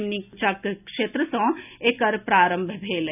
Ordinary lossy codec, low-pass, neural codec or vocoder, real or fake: none; 3.6 kHz; none; real